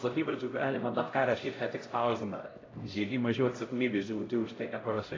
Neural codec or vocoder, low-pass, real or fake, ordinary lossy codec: codec, 16 kHz, 0.5 kbps, X-Codec, HuBERT features, trained on LibriSpeech; 7.2 kHz; fake; AAC, 32 kbps